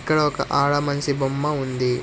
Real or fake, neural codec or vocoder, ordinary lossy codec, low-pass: real; none; none; none